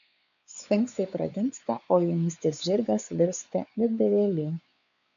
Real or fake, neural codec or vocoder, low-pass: fake; codec, 16 kHz, 4 kbps, X-Codec, WavLM features, trained on Multilingual LibriSpeech; 7.2 kHz